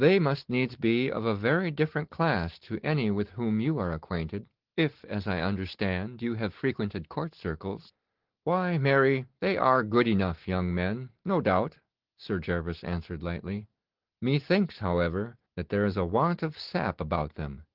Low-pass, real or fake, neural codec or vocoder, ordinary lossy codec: 5.4 kHz; real; none; Opus, 16 kbps